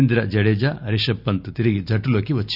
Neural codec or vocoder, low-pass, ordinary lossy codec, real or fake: none; 5.4 kHz; none; real